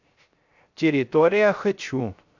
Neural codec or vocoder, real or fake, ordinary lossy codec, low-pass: codec, 16 kHz, 0.3 kbps, FocalCodec; fake; AAC, 48 kbps; 7.2 kHz